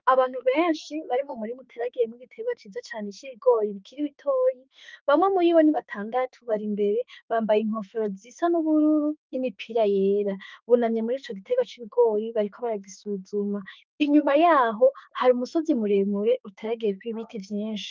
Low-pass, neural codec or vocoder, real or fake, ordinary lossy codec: 7.2 kHz; autoencoder, 48 kHz, 32 numbers a frame, DAC-VAE, trained on Japanese speech; fake; Opus, 24 kbps